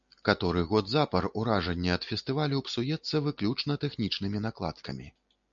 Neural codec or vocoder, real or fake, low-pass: none; real; 7.2 kHz